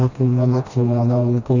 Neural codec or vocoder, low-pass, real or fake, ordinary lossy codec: codec, 16 kHz, 1 kbps, FreqCodec, smaller model; 7.2 kHz; fake; none